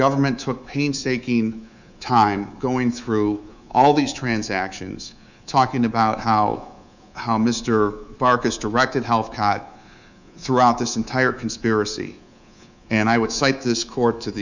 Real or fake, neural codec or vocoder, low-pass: fake; codec, 24 kHz, 3.1 kbps, DualCodec; 7.2 kHz